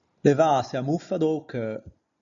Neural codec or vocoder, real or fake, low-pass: none; real; 7.2 kHz